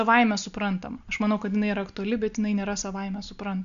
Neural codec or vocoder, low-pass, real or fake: none; 7.2 kHz; real